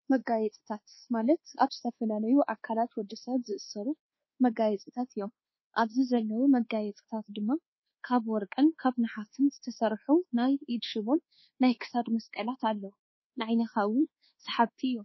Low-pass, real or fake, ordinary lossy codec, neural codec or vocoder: 7.2 kHz; fake; MP3, 24 kbps; codec, 24 kHz, 1.2 kbps, DualCodec